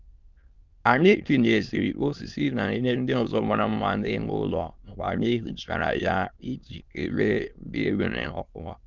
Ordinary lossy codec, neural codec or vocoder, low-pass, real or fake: Opus, 32 kbps; autoencoder, 22.05 kHz, a latent of 192 numbers a frame, VITS, trained on many speakers; 7.2 kHz; fake